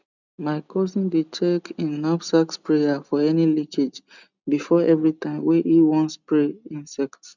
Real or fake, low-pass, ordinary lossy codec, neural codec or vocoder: real; 7.2 kHz; none; none